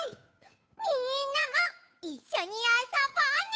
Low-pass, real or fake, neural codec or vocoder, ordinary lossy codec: none; fake; codec, 16 kHz, 8 kbps, FunCodec, trained on Chinese and English, 25 frames a second; none